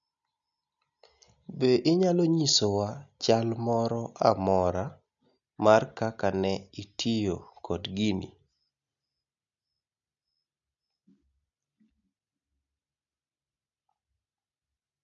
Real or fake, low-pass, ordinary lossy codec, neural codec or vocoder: real; 7.2 kHz; none; none